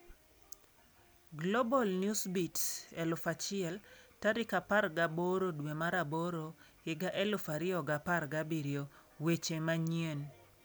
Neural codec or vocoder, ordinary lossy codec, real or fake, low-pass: none; none; real; none